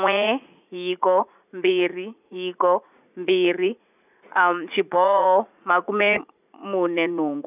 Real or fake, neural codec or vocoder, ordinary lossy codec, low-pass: fake; vocoder, 44.1 kHz, 80 mel bands, Vocos; none; 3.6 kHz